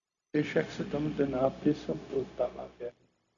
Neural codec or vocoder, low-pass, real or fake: codec, 16 kHz, 0.4 kbps, LongCat-Audio-Codec; 7.2 kHz; fake